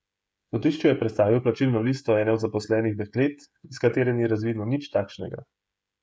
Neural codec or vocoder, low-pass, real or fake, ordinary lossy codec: codec, 16 kHz, 16 kbps, FreqCodec, smaller model; none; fake; none